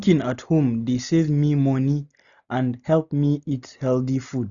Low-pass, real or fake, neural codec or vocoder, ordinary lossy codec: 7.2 kHz; real; none; none